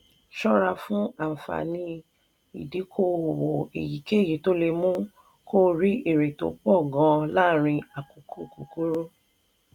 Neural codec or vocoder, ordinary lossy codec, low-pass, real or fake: none; none; 19.8 kHz; real